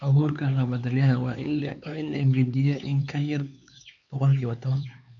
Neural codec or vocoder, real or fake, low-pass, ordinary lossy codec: codec, 16 kHz, 4 kbps, X-Codec, HuBERT features, trained on LibriSpeech; fake; 7.2 kHz; none